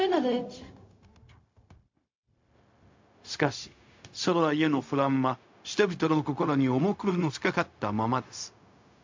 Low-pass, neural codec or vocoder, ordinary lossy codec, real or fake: 7.2 kHz; codec, 16 kHz, 0.4 kbps, LongCat-Audio-Codec; MP3, 48 kbps; fake